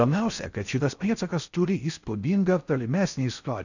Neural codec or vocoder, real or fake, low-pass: codec, 16 kHz in and 24 kHz out, 0.6 kbps, FocalCodec, streaming, 4096 codes; fake; 7.2 kHz